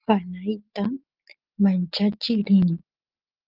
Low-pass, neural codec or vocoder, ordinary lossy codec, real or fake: 5.4 kHz; none; Opus, 24 kbps; real